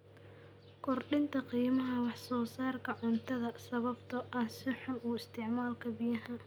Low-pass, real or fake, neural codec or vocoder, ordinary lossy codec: none; real; none; none